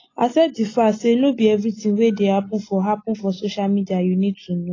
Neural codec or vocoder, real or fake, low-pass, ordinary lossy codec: none; real; 7.2 kHz; AAC, 32 kbps